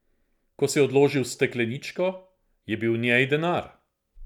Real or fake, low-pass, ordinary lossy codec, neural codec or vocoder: real; 19.8 kHz; none; none